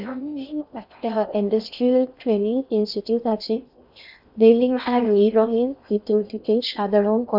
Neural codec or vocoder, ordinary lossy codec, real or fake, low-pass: codec, 16 kHz in and 24 kHz out, 0.6 kbps, FocalCodec, streaming, 2048 codes; none; fake; 5.4 kHz